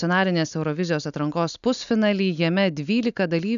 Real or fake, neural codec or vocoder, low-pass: real; none; 7.2 kHz